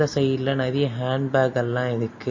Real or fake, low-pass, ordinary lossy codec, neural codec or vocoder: real; 7.2 kHz; MP3, 32 kbps; none